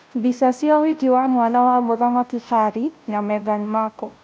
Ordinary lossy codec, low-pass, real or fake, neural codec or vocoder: none; none; fake; codec, 16 kHz, 0.5 kbps, FunCodec, trained on Chinese and English, 25 frames a second